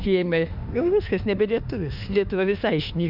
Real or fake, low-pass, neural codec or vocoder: fake; 5.4 kHz; autoencoder, 48 kHz, 32 numbers a frame, DAC-VAE, trained on Japanese speech